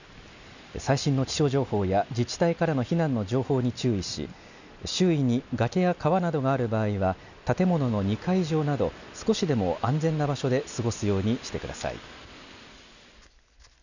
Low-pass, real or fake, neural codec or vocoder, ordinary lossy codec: 7.2 kHz; real; none; none